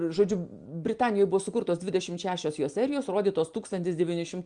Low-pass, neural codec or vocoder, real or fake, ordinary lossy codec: 9.9 kHz; none; real; Opus, 32 kbps